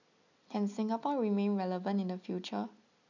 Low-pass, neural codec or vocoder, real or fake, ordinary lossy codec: 7.2 kHz; none; real; none